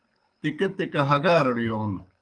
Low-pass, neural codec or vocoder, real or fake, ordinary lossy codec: 9.9 kHz; codec, 24 kHz, 6 kbps, HILCodec; fake; Opus, 32 kbps